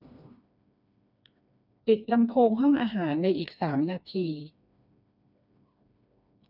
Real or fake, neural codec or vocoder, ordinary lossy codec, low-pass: fake; codec, 16 kHz, 2 kbps, FreqCodec, smaller model; none; 5.4 kHz